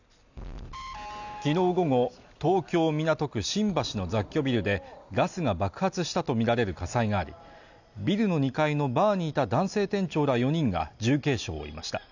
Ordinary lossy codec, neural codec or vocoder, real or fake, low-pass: none; none; real; 7.2 kHz